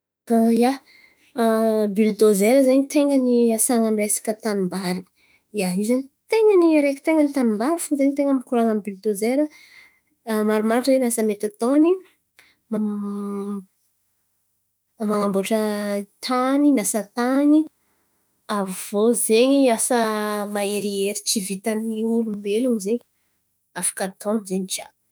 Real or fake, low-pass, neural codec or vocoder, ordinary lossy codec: fake; none; autoencoder, 48 kHz, 32 numbers a frame, DAC-VAE, trained on Japanese speech; none